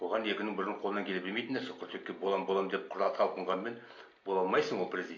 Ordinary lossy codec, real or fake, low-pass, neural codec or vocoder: MP3, 48 kbps; real; 7.2 kHz; none